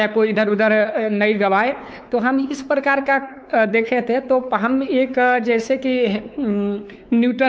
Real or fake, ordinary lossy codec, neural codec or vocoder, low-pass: fake; none; codec, 16 kHz, 4 kbps, X-Codec, WavLM features, trained on Multilingual LibriSpeech; none